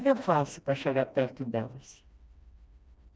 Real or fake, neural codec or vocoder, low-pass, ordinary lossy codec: fake; codec, 16 kHz, 1 kbps, FreqCodec, smaller model; none; none